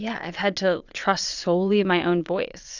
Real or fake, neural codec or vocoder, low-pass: fake; vocoder, 22.05 kHz, 80 mel bands, WaveNeXt; 7.2 kHz